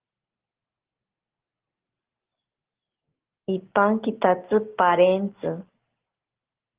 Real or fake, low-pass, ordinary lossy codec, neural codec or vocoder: real; 3.6 kHz; Opus, 16 kbps; none